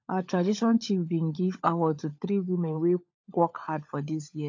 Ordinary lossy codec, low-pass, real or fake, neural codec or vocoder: AAC, 48 kbps; 7.2 kHz; fake; codec, 16 kHz, 16 kbps, FunCodec, trained on LibriTTS, 50 frames a second